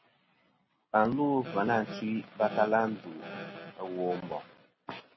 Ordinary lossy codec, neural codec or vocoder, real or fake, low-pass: MP3, 24 kbps; none; real; 7.2 kHz